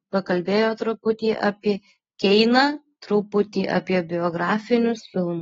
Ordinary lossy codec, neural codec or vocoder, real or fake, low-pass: AAC, 24 kbps; none; real; 7.2 kHz